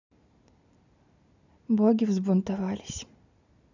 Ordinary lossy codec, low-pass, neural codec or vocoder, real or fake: none; 7.2 kHz; none; real